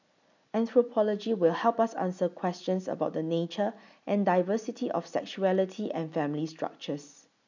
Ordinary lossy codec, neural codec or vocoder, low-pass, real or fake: none; none; 7.2 kHz; real